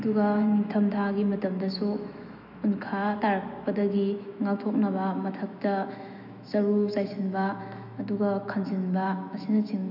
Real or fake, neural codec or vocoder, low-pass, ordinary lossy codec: fake; autoencoder, 48 kHz, 128 numbers a frame, DAC-VAE, trained on Japanese speech; 5.4 kHz; none